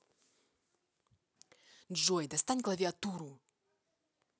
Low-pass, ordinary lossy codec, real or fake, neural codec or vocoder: none; none; real; none